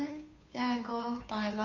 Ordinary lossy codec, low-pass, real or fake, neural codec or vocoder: none; 7.2 kHz; fake; codec, 16 kHz, 2 kbps, FunCodec, trained on Chinese and English, 25 frames a second